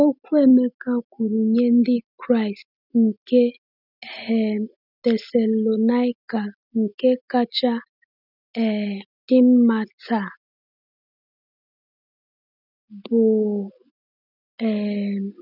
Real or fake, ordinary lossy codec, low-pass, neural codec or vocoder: real; none; 5.4 kHz; none